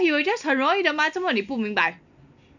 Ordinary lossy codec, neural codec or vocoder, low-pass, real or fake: none; none; 7.2 kHz; real